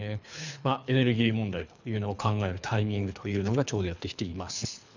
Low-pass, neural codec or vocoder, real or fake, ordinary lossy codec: 7.2 kHz; codec, 24 kHz, 3 kbps, HILCodec; fake; none